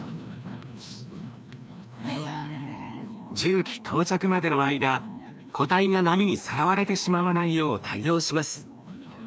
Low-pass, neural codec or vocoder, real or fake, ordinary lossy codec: none; codec, 16 kHz, 1 kbps, FreqCodec, larger model; fake; none